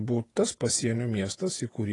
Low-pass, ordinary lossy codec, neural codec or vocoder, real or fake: 10.8 kHz; AAC, 32 kbps; vocoder, 48 kHz, 128 mel bands, Vocos; fake